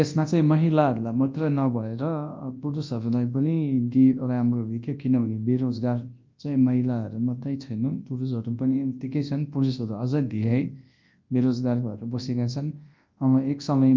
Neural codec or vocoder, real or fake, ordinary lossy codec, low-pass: codec, 24 kHz, 0.9 kbps, WavTokenizer, large speech release; fake; Opus, 24 kbps; 7.2 kHz